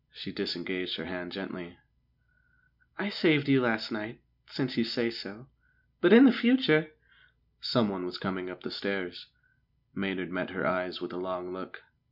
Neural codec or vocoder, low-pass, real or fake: none; 5.4 kHz; real